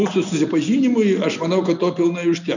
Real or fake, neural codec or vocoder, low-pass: real; none; 7.2 kHz